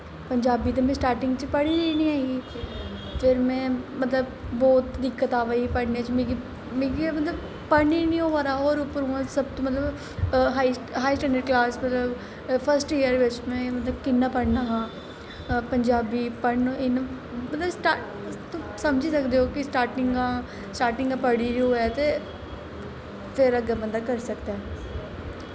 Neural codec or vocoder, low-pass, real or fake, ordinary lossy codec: none; none; real; none